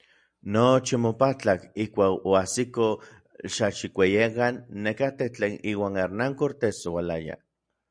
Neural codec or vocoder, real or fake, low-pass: none; real; 9.9 kHz